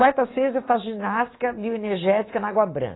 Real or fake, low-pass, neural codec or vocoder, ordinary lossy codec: fake; 7.2 kHz; codec, 24 kHz, 3.1 kbps, DualCodec; AAC, 16 kbps